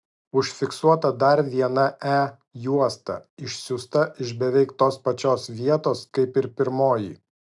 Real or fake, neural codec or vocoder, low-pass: real; none; 10.8 kHz